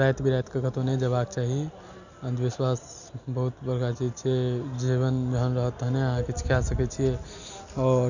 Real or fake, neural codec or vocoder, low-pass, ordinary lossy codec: real; none; 7.2 kHz; none